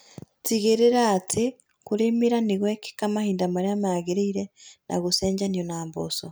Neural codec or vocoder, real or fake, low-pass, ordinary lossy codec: none; real; none; none